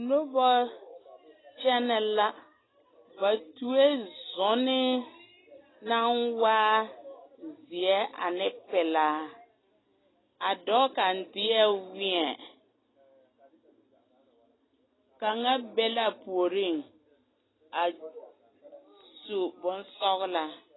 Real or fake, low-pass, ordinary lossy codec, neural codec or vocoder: real; 7.2 kHz; AAC, 16 kbps; none